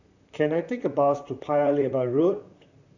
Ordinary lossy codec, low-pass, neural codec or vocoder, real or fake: none; 7.2 kHz; vocoder, 44.1 kHz, 128 mel bands, Pupu-Vocoder; fake